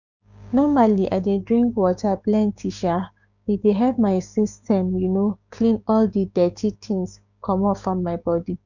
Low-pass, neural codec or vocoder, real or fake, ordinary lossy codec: 7.2 kHz; codec, 16 kHz, 6 kbps, DAC; fake; none